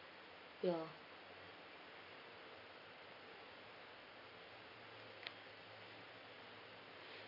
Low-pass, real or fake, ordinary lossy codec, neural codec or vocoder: 5.4 kHz; real; none; none